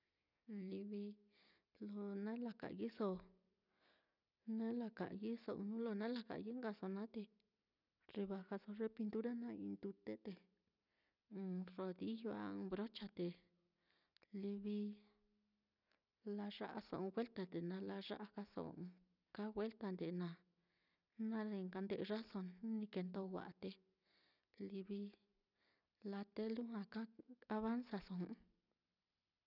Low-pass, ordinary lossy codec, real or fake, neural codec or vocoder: 5.4 kHz; none; real; none